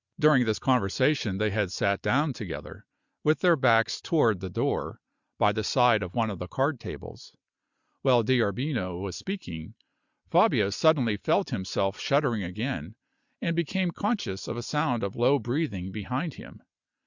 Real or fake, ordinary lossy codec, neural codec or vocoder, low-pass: real; Opus, 64 kbps; none; 7.2 kHz